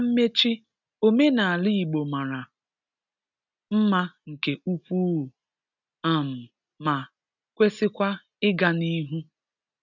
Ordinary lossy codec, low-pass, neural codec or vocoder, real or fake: none; 7.2 kHz; none; real